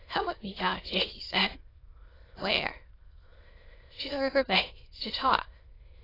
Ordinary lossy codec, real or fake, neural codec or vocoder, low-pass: AAC, 24 kbps; fake; autoencoder, 22.05 kHz, a latent of 192 numbers a frame, VITS, trained on many speakers; 5.4 kHz